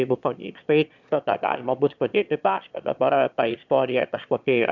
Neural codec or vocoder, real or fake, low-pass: autoencoder, 22.05 kHz, a latent of 192 numbers a frame, VITS, trained on one speaker; fake; 7.2 kHz